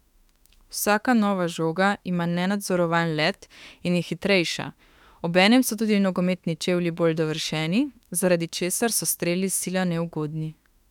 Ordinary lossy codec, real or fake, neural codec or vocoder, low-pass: none; fake; autoencoder, 48 kHz, 32 numbers a frame, DAC-VAE, trained on Japanese speech; 19.8 kHz